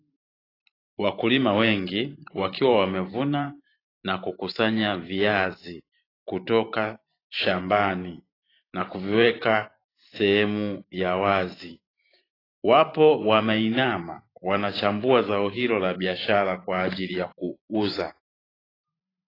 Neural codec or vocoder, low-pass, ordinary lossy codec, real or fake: vocoder, 44.1 kHz, 128 mel bands every 512 samples, BigVGAN v2; 5.4 kHz; AAC, 24 kbps; fake